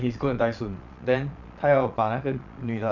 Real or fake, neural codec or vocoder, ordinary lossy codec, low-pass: fake; vocoder, 22.05 kHz, 80 mel bands, Vocos; none; 7.2 kHz